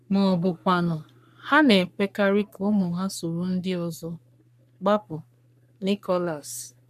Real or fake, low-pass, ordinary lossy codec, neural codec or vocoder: fake; 14.4 kHz; none; codec, 44.1 kHz, 3.4 kbps, Pupu-Codec